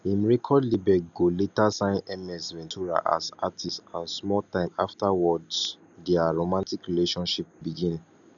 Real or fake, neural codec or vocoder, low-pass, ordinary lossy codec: real; none; 7.2 kHz; none